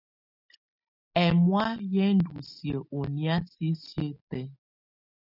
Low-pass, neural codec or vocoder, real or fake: 5.4 kHz; none; real